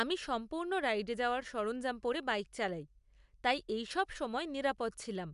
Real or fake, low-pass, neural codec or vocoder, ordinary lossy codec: real; 10.8 kHz; none; MP3, 96 kbps